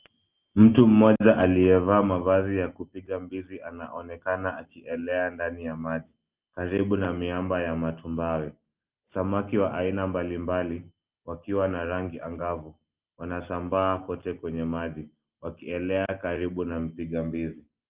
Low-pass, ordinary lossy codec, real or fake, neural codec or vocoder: 3.6 kHz; Opus, 32 kbps; real; none